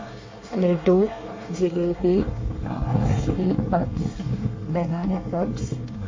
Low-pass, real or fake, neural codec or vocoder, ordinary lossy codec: 7.2 kHz; fake; codec, 24 kHz, 1 kbps, SNAC; MP3, 32 kbps